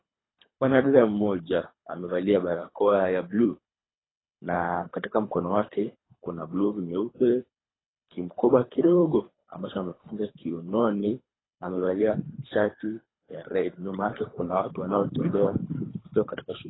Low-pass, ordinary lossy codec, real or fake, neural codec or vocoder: 7.2 kHz; AAC, 16 kbps; fake; codec, 24 kHz, 3 kbps, HILCodec